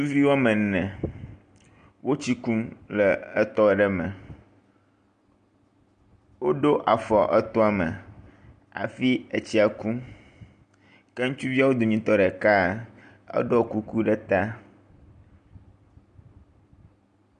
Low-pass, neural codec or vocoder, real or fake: 9.9 kHz; none; real